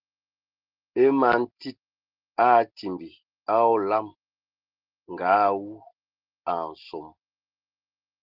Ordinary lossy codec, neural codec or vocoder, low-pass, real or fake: Opus, 16 kbps; none; 5.4 kHz; real